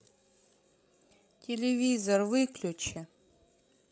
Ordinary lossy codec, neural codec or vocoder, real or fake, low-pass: none; codec, 16 kHz, 16 kbps, FreqCodec, larger model; fake; none